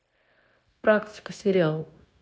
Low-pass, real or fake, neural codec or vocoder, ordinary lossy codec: none; fake; codec, 16 kHz, 0.9 kbps, LongCat-Audio-Codec; none